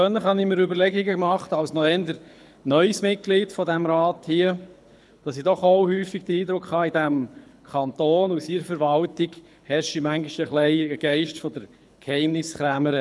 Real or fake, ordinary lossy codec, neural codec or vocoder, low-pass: fake; none; codec, 24 kHz, 6 kbps, HILCodec; none